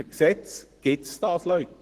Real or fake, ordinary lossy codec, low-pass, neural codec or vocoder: fake; Opus, 16 kbps; 14.4 kHz; codec, 44.1 kHz, 7.8 kbps, Pupu-Codec